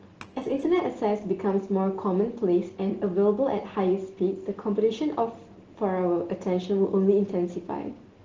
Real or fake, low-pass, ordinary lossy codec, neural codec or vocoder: real; 7.2 kHz; Opus, 16 kbps; none